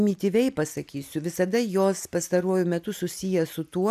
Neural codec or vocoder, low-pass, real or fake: none; 14.4 kHz; real